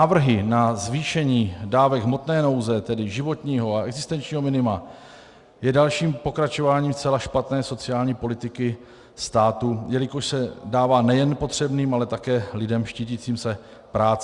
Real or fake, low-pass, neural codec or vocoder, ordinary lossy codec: real; 10.8 kHz; none; Opus, 64 kbps